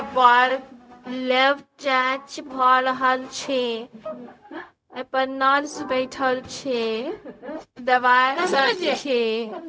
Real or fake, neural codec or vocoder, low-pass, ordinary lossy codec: fake; codec, 16 kHz, 0.4 kbps, LongCat-Audio-Codec; none; none